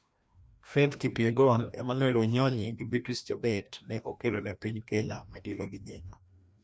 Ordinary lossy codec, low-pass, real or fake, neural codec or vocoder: none; none; fake; codec, 16 kHz, 1 kbps, FreqCodec, larger model